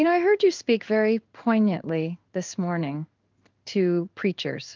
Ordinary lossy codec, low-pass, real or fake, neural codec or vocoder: Opus, 24 kbps; 7.2 kHz; fake; codec, 16 kHz in and 24 kHz out, 1 kbps, XY-Tokenizer